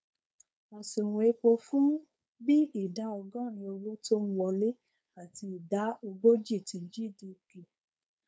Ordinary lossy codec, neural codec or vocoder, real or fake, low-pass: none; codec, 16 kHz, 4.8 kbps, FACodec; fake; none